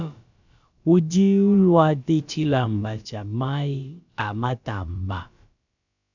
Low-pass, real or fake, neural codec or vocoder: 7.2 kHz; fake; codec, 16 kHz, about 1 kbps, DyCAST, with the encoder's durations